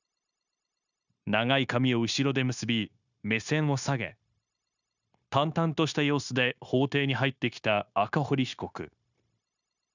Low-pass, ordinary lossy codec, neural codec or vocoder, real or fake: 7.2 kHz; none; codec, 16 kHz, 0.9 kbps, LongCat-Audio-Codec; fake